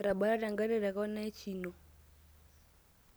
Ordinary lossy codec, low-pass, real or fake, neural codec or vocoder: none; none; real; none